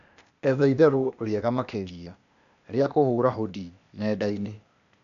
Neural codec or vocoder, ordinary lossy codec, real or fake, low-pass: codec, 16 kHz, 0.8 kbps, ZipCodec; none; fake; 7.2 kHz